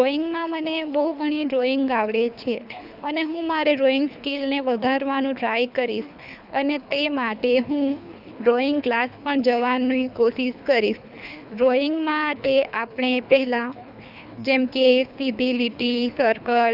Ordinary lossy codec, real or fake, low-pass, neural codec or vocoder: none; fake; 5.4 kHz; codec, 24 kHz, 3 kbps, HILCodec